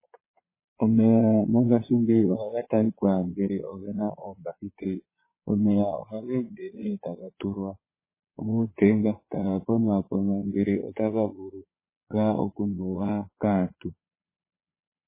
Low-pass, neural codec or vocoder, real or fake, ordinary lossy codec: 3.6 kHz; vocoder, 22.05 kHz, 80 mel bands, Vocos; fake; MP3, 16 kbps